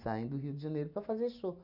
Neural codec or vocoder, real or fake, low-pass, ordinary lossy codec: none; real; 5.4 kHz; none